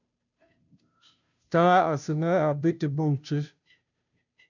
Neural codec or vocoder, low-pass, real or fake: codec, 16 kHz, 0.5 kbps, FunCodec, trained on Chinese and English, 25 frames a second; 7.2 kHz; fake